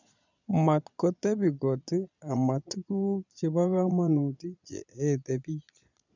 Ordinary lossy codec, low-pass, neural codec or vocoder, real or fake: none; 7.2 kHz; vocoder, 22.05 kHz, 80 mel bands, Vocos; fake